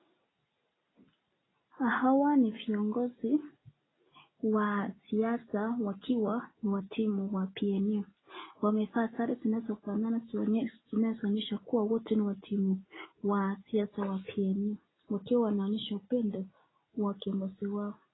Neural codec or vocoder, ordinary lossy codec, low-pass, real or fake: none; AAC, 16 kbps; 7.2 kHz; real